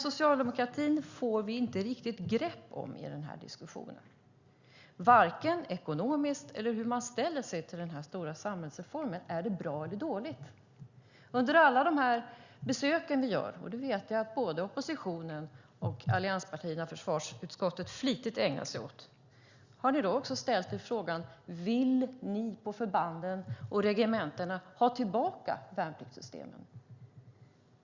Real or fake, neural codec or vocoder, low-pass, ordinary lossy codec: real; none; 7.2 kHz; Opus, 64 kbps